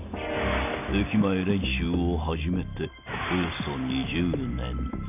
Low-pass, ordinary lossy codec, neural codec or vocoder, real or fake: 3.6 kHz; none; none; real